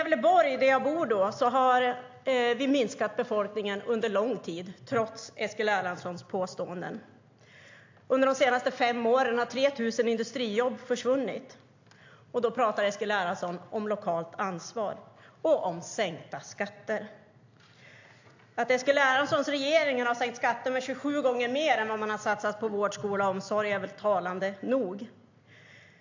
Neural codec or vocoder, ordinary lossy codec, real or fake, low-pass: none; AAC, 48 kbps; real; 7.2 kHz